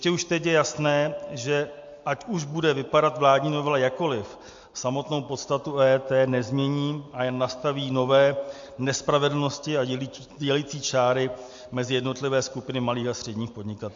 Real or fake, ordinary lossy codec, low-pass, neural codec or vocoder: real; MP3, 48 kbps; 7.2 kHz; none